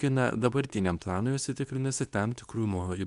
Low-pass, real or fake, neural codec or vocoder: 10.8 kHz; fake; codec, 24 kHz, 0.9 kbps, WavTokenizer, medium speech release version 2